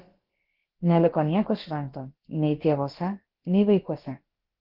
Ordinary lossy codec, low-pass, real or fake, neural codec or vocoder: Opus, 16 kbps; 5.4 kHz; fake; codec, 16 kHz, about 1 kbps, DyCAST, with the encoder's durations